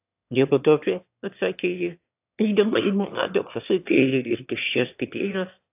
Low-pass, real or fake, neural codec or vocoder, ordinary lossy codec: 3.6 kHz; fake; autoencoder, 22.05 kHz, a latent of 192 numbers a frame, VITS, trained on one speaker; AAC, 32 kbps